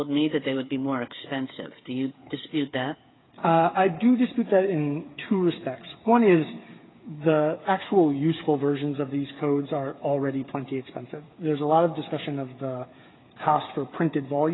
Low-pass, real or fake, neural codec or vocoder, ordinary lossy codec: 7.2 kHz; fake; codec, 16 kHz, 8 kbps, FreqCodec, smaller model; AAC, 16 kbps